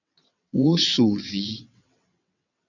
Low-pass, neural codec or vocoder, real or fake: 7.2 kHz; vocoder, 22.05 kHz, 80 mel bands, WaveNeXt; fake